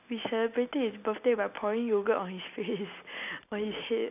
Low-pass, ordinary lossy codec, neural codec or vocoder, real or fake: 3.6 kHz; none; none; real